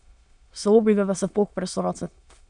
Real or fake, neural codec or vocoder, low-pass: fake; autoencoder, 22.05 kHz, a latent of 192 numbers a frame, VITS, trained on many speakers; 9.9 kHz